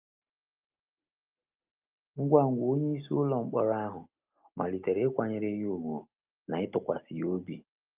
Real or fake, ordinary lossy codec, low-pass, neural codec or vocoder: real; Opus, 24 kbps; 3.6 kHz; none